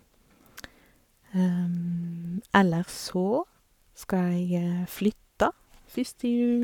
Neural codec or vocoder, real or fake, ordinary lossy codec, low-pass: codec, 44.1 kHz, 7.8 kbps, Pupu-Codec; fake; none; 19.8 kHz